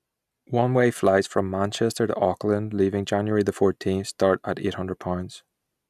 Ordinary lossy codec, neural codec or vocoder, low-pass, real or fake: none; none; 14.4 kHz; real